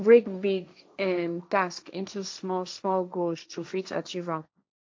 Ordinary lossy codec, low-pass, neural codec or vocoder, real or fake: none; none; codec, 16 kHz, 1.1 kbps, Voila-Tokenizer; fake